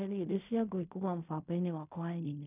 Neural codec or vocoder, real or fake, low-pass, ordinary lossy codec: codec, 16 kHz in and 24 kHz out, 0.4 kbps, LongCat-Audio-Codec, fine tuned four codebook decoder; fake; 3.6 kHz; none